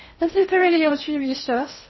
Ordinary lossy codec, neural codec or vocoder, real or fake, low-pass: MP3, 24 kbps; codec, 16 kHz in and 24 kHz out, 0.6 kbps, FocalCodec, streaming, 4096 codes; fake; 7.2 kHz